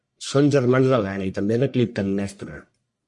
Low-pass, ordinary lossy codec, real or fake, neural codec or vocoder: 10.8 kHz; MP3, 48 kbps; fake; codec, 44.1 kHz, 1.7 kbps, Pupu-Codec